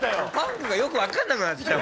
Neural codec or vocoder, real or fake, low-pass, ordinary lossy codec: none; real; none; none